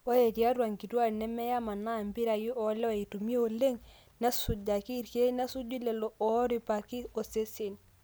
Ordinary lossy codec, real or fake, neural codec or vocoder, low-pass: none; real; none; none